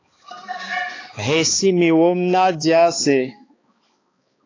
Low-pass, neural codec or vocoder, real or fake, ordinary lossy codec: 7.2 kHz; codec, 16 kHz, 2 kbps, X-Codec, HuBERT features, trained on balanced general audio; fake; AAC, 32 kbps